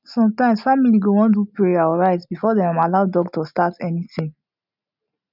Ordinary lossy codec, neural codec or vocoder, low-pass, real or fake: none; none; 5.4 kHz; real